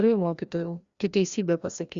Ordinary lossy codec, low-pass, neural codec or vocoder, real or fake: Opus, 64 kbps; 7.2 kHz; codec, 16 kHz, 1 kbps, FreqCodec, larger model; fake